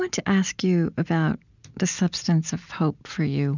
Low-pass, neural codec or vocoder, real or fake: 7.2 kHz; none; real